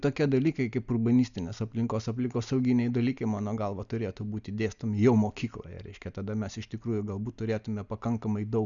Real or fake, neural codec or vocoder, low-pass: real; none; 7.2 kHz